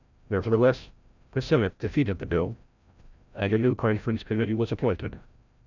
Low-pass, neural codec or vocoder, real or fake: 7.2 kHz; codec, 16 kHz, 0.5 kbps, FreqCodec, larger model; fake